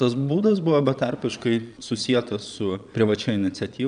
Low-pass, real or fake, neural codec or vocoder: 9.9 kHz; fake; vocoder, 22.05 kHz, 80 mel bands, Vocos